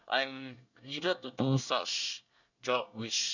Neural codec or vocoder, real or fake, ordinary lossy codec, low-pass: codec, 24 kHz, 1 kbps, SNAC; fake; none; 7.2 kHz